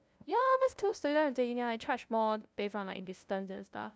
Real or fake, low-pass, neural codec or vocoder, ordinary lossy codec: fake; none; codec, 16 kHz, 0.5 kbps, FunCodec, trained on LibriTTS, 25 frames a second; none